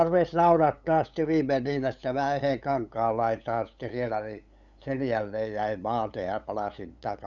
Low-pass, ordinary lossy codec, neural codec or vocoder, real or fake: 7.2 kHz; none; codec, 16 kHz, 8 kbps, FunCodec, trained on LibriTTS, 25 frames a second; fake